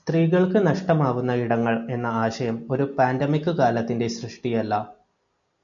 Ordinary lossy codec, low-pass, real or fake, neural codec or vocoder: MP3, 96 kbps; 7.2 kHz; real; none